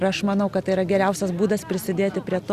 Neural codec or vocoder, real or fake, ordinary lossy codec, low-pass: none; real; Opus, 64 kbps; 14.4 kHz